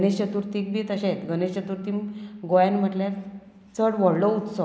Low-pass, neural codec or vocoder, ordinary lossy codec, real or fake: none; none; none; real